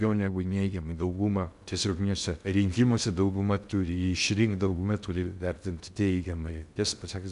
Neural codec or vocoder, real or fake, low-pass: codec, 16 kHz in and 24 kHz out, 0.6 kbps, FocalCodec, streaming, 2048 codes; fake; 10.8 kHz